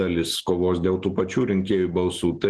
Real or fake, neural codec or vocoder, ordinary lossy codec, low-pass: real; none; Opus, 16 kbps; 10.8 kHz